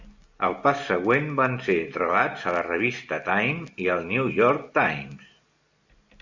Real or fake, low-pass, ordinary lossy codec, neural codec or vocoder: real; 7.2 kHz; Opus, 64 kbps; none